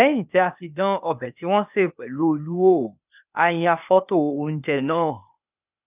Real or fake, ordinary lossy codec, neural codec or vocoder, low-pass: fake; none; codec, 16 kHz, 0.8 kbps, ZipCodec; 3.6 kHz